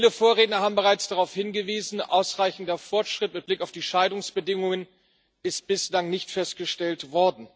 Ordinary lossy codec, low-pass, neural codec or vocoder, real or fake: none; none; none; real